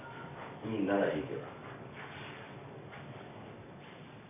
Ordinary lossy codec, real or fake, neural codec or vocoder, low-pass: none; fake; codec, 44.1 kHz, 7.8 kbps, DAC; 3.6 kHz